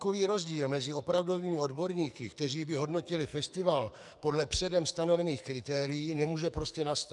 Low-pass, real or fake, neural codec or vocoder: 10.8 kHz; fake; codec, 44.1 kHz, 2.6 kbps, SNAC